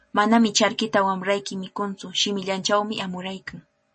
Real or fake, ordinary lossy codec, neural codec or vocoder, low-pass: real; MP3, 32 kbps; none; 10.8 kHz